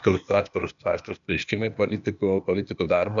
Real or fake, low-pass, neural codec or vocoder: fake; 7.2 kHz; codec, 16 kHz, 0.8 kbps, ZipCodec